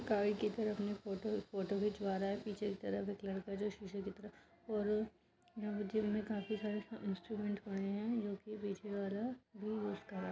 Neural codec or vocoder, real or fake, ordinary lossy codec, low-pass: none; real; none; none